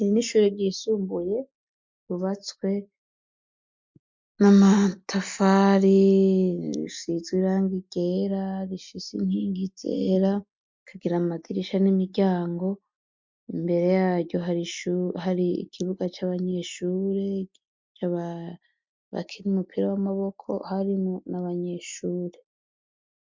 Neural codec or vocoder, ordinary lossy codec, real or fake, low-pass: none; MP3, 64 kbps; real; 7.2 kHz